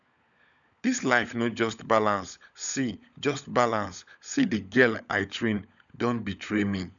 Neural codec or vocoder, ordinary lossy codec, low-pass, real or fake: codec, 16 kHz, 16 kbps, FunCodec, trained on LibriTTS, 50 frames a second; none; 7.2 kHz; fake